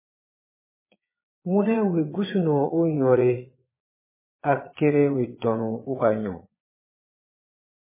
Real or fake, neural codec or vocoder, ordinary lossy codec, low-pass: fake; vocoder, 22.05 kHz, 80 mel bands, Vocos; MP3, 16 kbps; 3.6 kHz